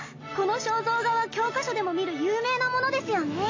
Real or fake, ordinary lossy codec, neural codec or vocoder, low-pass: real; AAC, 32 kbps; none; 7.2 kHz